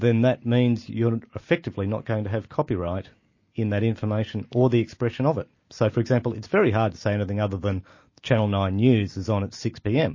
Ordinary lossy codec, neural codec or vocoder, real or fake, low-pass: MP3, 32 kbps; none; real; 7.2 kHz